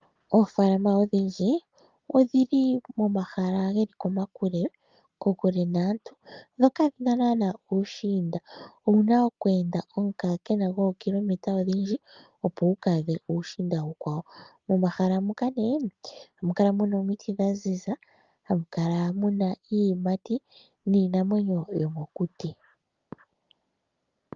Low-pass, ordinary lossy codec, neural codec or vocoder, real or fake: 7.2 kHz; Opus, 32 kbps; none; real